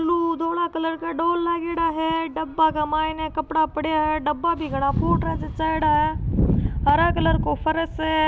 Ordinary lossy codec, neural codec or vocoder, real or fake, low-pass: none; none; real; none